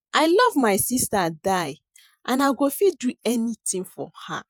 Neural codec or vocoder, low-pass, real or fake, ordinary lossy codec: vocoder, 48 kHz, 128 mel bands, Vocos; none; fake; none